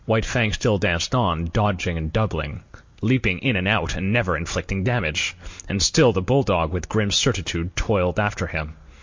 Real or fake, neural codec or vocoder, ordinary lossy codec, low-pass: real; none; MP3, 48 kbps; 7.2 kHz